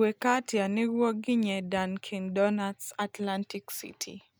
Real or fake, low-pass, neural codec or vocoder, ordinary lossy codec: real; none; none; none